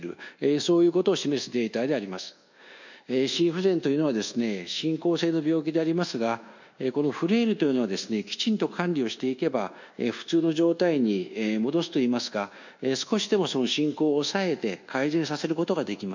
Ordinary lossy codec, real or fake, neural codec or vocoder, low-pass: none; fake; codec, 24 kHz, 1.2 kbps, DualCodec; 7.2 kHz